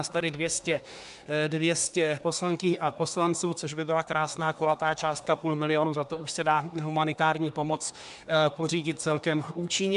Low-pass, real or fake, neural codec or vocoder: 10.8 kHz; fake; codec, 24 kHz, 1 kbps, SNAC